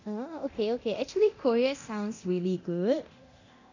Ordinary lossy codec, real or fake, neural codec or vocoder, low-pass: none; fake; codec, 24 kHz, 0.9 kbps, DualCodec; 7.2 kHz